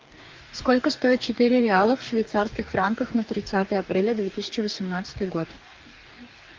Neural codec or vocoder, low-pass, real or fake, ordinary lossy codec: codec, 44.1 kHz, 2.6 kbps, DAC; 7.2 kHz; fake; Opus, 32 kbps